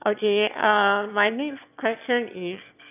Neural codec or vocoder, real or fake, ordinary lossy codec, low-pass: autoencoder, 22.05 kHz, a latent of 192 numbers a frame, VITS, trained on one speaker; fake; none; 3.6 kHz